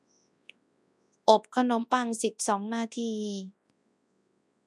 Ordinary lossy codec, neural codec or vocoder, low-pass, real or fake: none; codec, 24 kHz, 0.9 kbps, WavTokenizer, large speech release; none; fake